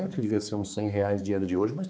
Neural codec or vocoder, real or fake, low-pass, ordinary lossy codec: codec, 16 kHz, 4 kbps, X-Codec, HuBERT features, trained on balanced general audio; fake; none; none